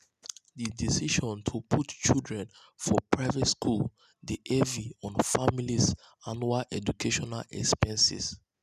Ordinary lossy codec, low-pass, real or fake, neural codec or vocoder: none; 14.4 kHz; real; none